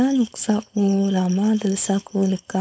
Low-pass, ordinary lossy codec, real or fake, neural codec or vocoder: none; none; fake; codec, 16 kHz, 4.8 kbps, FACodec